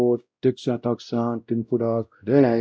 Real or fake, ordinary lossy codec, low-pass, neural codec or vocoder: fake; none; none; codec, 16 kHz, 0.5 kbps, X-Codec, WavLM features, trained on Multilingual LibriSpeech